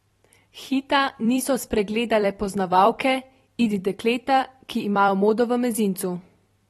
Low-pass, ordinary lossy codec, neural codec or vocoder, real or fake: 19.8 kHz; AAC, 32 kbps; vocoder, 44.1 kHz, 128 mel bands every 256 samples, BigVGAN v2; fake